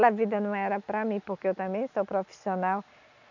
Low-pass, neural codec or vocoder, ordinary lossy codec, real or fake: 7.2 kHz; none; none; real